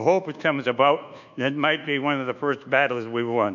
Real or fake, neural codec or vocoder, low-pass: fake; codec, 24 kHz, 1.2 kbps, DualCodec; 7.2 kHz